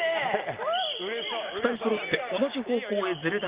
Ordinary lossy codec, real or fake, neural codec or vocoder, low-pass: Opus, 32 kbps; real; none; 3.6 kHz